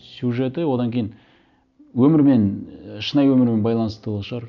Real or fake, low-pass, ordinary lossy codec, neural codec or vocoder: real; 7.2 kHz; none; none